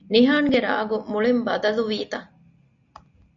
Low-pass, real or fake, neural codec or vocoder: 7.2 kHz; real; none